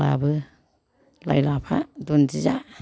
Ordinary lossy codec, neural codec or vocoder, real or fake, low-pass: none; none; real; none